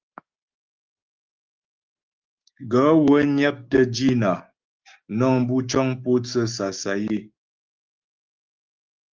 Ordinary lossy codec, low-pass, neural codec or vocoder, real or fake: Opus, 32 kbps; 7.2 kHz; codec, 16 kHz, 6 kbps, DAC; fake